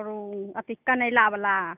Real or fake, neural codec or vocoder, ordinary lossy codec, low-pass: real; none; none; 3.6 kHz